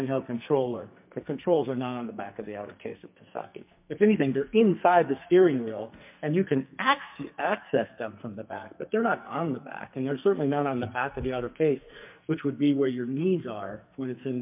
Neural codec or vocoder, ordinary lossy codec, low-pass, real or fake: codec, 44.1 kHz, 2.6 kbps, SNAC; MP3, 32 kbps; 3.6 kHz; fake